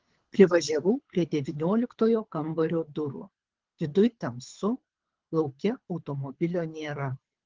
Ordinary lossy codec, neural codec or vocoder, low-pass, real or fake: Opus, 16 kbps; codec, 24 kHz, 6 kbps, HILCodec; 7.2 kHz; fake